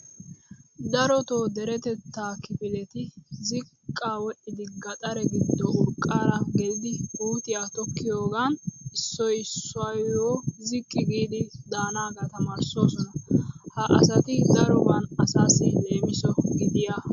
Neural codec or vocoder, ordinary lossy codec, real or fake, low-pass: none; MP3, 48 kbps; real; 7.2 kHz